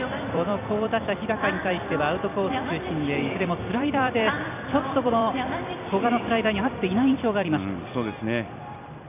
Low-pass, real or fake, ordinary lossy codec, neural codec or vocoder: 3.6 kHz; fake; none; vocoder, 44.1 kHz, 128 mel bands every 256 samples, BigVGAN v2